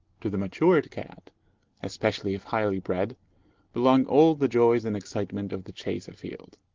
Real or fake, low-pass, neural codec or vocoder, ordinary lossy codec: real; 7.2 kHz; none; Opus, 16 kbps